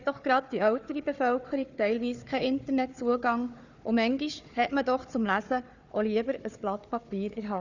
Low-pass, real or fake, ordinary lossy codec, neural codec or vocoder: 7.2 kHz; fake; none; codec, 16 kHz, 4 kbps, FunCodec, trained on Chinese and English, 50 frames a second